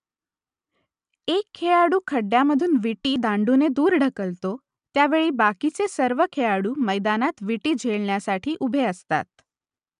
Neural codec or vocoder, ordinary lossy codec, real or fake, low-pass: none; none; real; 10.8 kHz